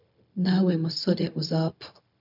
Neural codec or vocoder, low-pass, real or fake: codec, 16 kHz, 0.4 kbps, LongCat-Audio-Codec; 5.4 kHz; fake